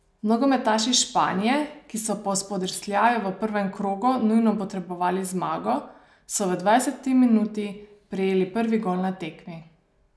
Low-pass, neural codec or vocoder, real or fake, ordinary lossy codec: none; none; real; none